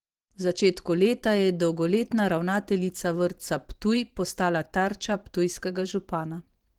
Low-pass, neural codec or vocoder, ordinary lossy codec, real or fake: 19.8 kHz; vocoder, 44.1 kHz, 128 mel bands every 512 samples, BigVGAN v2; Opus, 16 kbps; fake